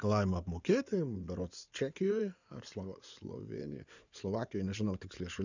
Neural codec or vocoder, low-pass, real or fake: codec, 16 kHz in and 24 kHz out, 2.2 kbps, FireRedTTS-2 codec; 7.2 kHz; fake